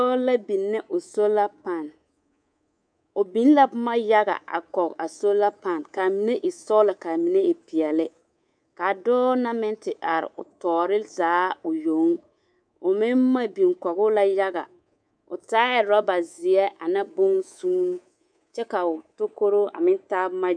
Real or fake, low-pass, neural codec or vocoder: fake; 9.9 kHz; codec, 24 kHz, 3.1 kbps, DualCodec